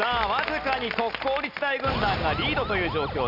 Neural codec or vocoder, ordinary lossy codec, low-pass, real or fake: none; none; 5.4 kHz; real